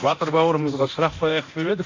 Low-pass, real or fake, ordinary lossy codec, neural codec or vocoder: 7.2 kHz; fake; AAC, 48 kbps; codec, 24 kHz, 0.9 kbps, DualCodec